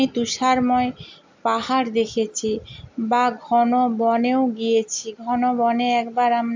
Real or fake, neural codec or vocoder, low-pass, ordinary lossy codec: real; none; 7.2 kHz; MP3, 64 kbps